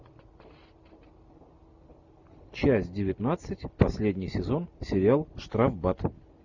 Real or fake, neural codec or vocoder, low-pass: real; none; 7.2 kHz